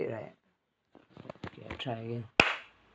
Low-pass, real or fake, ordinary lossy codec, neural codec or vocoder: none; real; none; none